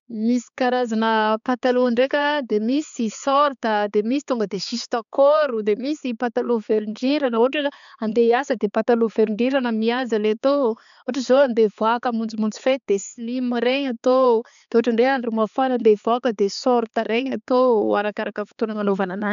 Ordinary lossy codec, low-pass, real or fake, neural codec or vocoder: none; 7.2 kHz; fake; codec, 16 kHz, 4 kbps, X-Codec, HuBERT features, trained on balanced general audio